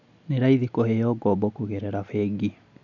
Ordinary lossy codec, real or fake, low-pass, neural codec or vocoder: none; real; 7.2 kHz; none